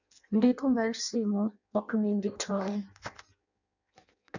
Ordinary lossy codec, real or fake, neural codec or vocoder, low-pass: none; fake; codec, 16 kHz in and 24 kHz out, 0.6 kbps, FireRedTTS-2 codec; 7.2 kHz